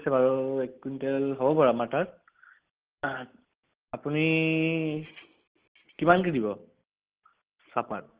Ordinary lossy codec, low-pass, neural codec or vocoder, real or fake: Opus, 16 kbps; 3.6 kHz; none; real